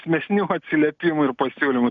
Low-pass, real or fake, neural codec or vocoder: 7.2 kHz; real; none